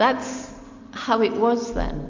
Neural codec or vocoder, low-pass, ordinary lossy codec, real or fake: none; 7.2 kHz; MP3, 64 kbps; real